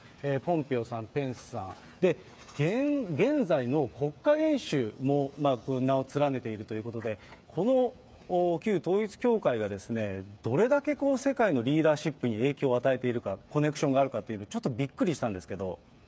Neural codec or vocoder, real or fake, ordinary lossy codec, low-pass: codec, 16 kHz, 8 kbps, FreqCodec, smaller model; fake; none; none